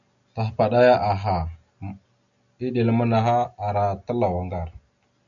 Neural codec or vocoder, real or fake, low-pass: none; real; 7.2 kHz